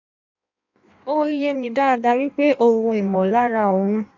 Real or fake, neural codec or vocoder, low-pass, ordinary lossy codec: fake; codec, 16 kHz in and 24 kHz out, 1.1 kbps, FireRedTTS-2 codec; 7.2 kHz; none